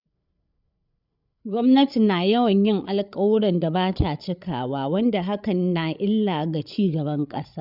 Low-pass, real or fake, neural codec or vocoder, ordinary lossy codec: 5.4 kHz; fake; codec, 16 kHz, 8 kbps, FreqCodec, larger model; none